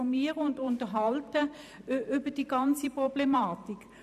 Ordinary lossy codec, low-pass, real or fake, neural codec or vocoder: none; 14.4 kHz; fake; vocoder, 44.1 kHz, 128 mel bands every 512 samples, BigVGAN v2